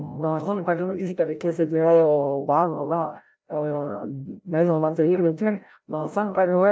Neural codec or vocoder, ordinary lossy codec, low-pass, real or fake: codec, 16 kHz, 0.5 kbps, FreqCodec, larger model; none; none; fake